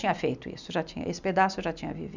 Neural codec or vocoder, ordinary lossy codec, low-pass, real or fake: none; none; 7.2 kHz; real